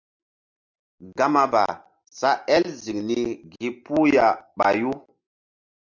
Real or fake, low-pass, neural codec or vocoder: real; 7.2 kHz; none